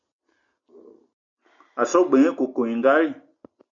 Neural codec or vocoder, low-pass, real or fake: none; 7.2 kHz; real